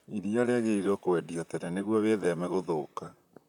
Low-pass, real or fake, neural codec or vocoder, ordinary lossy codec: 19.8 kHz; fake; vocoder, 44.1 kHz, 128 mel bands, Pupu-Vocoder; none